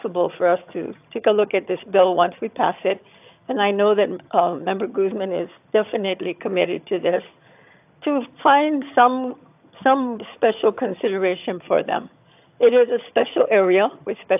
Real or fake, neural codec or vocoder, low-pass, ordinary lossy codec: fake; vocoder, 22.05 kHz, 80 mel bands, HiFi-GAN; 3.6 kHz; AAC, 32 kbps